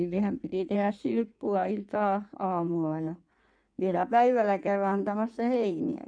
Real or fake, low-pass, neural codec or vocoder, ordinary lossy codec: fake; 9.9 kHz; codec, 16 kHz in and 24 kHz out, 1.1 kbps, FireRedTTS-2 codec; none